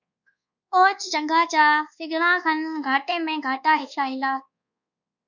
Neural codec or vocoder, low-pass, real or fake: codec, 24 kHz, 1.2 kbps, DualCodec; 7.2 kHz; fake